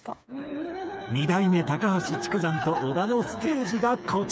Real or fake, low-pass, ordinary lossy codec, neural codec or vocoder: fake; none; none; codec, 16 kHz, 4 kbps, FreqCodec, smaller model